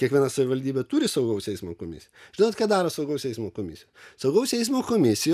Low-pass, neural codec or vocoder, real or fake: 14.4 kHz; none; real